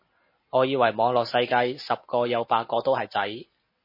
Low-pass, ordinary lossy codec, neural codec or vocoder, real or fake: 5.4 kHz; MP3, 24 kbps; none; real